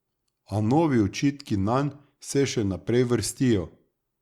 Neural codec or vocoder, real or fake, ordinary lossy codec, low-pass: none; real; Opus, 64 kbps; 19.8 kHz